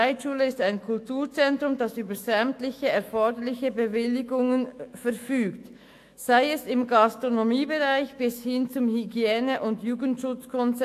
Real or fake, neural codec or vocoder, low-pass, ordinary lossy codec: fake; autoencoder, 48 kHz, 128 numbers a frame, DAC-VAE, trained on Japanese speech; 14.4 kHz; AAC, 64 kbps